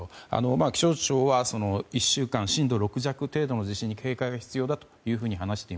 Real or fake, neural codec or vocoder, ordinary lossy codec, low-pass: real; none; none; none